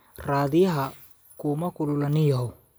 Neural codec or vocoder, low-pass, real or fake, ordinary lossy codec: none; none; real; none